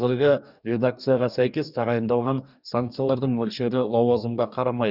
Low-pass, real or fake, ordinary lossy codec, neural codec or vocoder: 5.4 kHz; fake; none; codec, 44.1 kHz, 2.6 kbps, DAC